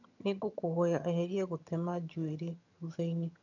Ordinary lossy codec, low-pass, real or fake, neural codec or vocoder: none; 7.2 kHz; fake; vocoder, 22.05 kHz, 80 mel bands, HiFi-GAN